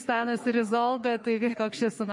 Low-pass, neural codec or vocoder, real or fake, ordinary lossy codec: 10.8 kHz; codec, 44.1 kHz, 3.4 kbps, Pupu-Codec; fake; MP3, 48 kbps